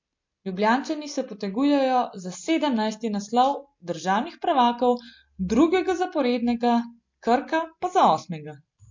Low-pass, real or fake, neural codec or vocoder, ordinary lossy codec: 7.2 kHz; real; none; MP3, 48 kbps